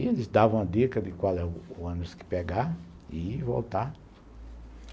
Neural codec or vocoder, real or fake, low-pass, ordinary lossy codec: none; real; none; none